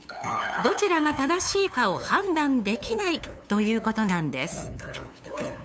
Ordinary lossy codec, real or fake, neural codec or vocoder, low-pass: none; fake; codec, 16 kHz, 2 kbps, FunCodec, trained on LibriTTS, 25 frames a second; none